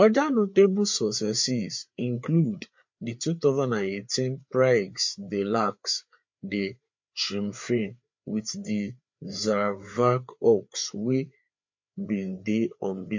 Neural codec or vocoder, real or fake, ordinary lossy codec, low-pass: codec, 16 kHz, 4 kbps, FreqCodec, larger model; fake; MP3, 48 kbps; 7.2 kHz